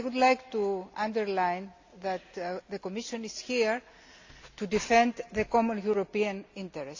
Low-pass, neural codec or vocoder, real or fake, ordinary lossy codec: 7.2 kHz; none; real; AAC, 48 kbps